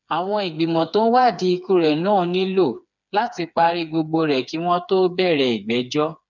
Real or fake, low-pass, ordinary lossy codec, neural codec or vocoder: fake; 7.2 kHz; none; codec, 16 kHz, 4 kbps, FreqCodec, smaller model